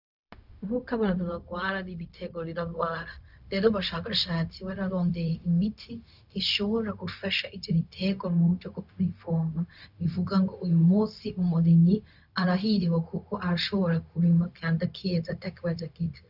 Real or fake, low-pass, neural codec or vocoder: fake; 5.4 kHz; codec, 16 kHz, 0.4 kbps, LongCat-Audio-Codec